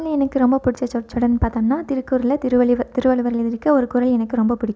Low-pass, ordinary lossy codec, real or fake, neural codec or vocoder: none; none; real; none